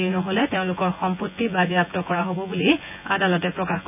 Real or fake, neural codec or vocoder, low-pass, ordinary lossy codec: fake; vocoder, 24 kHz, 100 mel bands, Vocos; 3.6 kHz; none